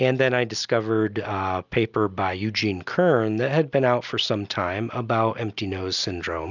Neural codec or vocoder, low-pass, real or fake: none; 7.2 kHz; real